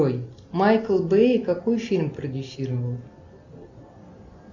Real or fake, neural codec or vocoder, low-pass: real; none; 7.2 kHz